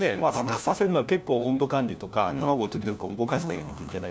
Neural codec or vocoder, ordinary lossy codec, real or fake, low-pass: codec, 16 kHz, 1 kbps, FunCodec, trained on LibriTTS, 50 frames a second; none; fake; none